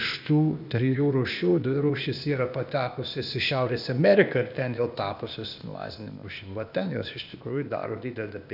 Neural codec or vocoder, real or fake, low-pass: codec, 16 kHz, 0.8 kbps, ZipCodec; fake; 5.4 kHz